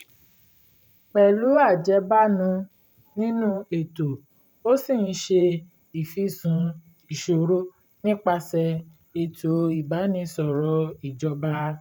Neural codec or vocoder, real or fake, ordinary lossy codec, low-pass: vocoder, 44.1 kHz, 128 mel bands every 512 samples, BigVGAN v2; fake; none; 19.8 kHz